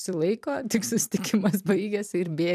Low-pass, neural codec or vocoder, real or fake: 14.4 kHz; vocoder, 44.1 kHz, 128 mel bands every 256 samples, BigVGAN v2; fake